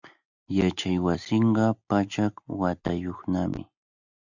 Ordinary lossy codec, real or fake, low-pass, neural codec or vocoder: Opus, 64 kbps; real; 7.2 kHz; none